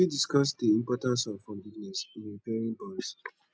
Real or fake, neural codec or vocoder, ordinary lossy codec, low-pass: real; none; none; none